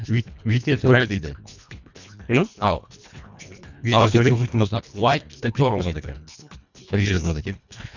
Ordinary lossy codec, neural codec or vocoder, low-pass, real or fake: none; codec, 24 kHz, 1.5 kbps, HILCodec; 7.2 kHz; fake